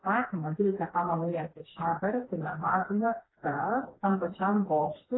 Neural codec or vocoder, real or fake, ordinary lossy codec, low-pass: codec, 16 kHz, 2 kbps, FreqCodec, smaller model; fake; AAC, 16 kbps; 7.2 kHz